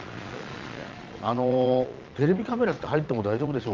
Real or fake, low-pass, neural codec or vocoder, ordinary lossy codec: fake; 7.2 kHz; vocoder, 22.05 kHz, 80 mel bands, Vocos; Opus, 32 kbps